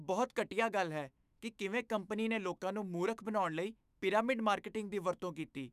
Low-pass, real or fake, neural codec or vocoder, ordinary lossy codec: 14.4 kHz; fake; autoencoder, 48 kHz, 128 numbers a frame, DAC-VAE, trained on Japanese speech; none